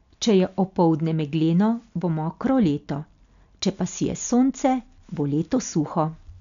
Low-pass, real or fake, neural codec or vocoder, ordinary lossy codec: 7.2 kHz; real; none; none